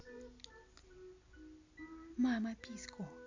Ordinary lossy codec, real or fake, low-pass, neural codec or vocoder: MP3, 64 kbps; real; 7.2 kHz; none